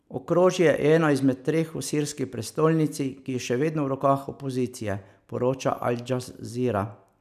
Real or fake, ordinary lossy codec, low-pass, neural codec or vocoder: real; none; 14.4 kHz; none